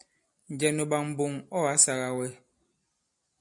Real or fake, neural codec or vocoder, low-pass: real; none; 10.8 kHz